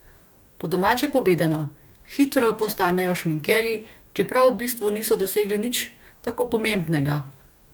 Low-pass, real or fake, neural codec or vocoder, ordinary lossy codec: none; fake; codec, 44.1 kHz, 2.6 kbps, DAC; none